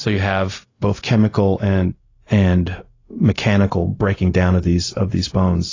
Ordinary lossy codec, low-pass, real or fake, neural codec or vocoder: AAC, 32 kbps; 7.2 kHz; fake; codec, 16 kHz, 0.4 kbps, LongCat-Audio-Codec